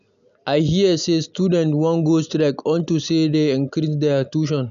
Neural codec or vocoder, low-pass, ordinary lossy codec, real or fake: none; 7.2 kHz; none; real